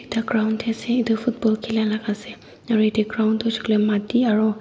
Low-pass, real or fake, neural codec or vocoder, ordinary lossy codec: none; real; none; none